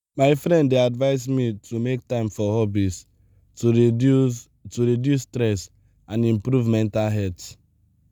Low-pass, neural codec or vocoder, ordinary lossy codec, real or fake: none; none; none; real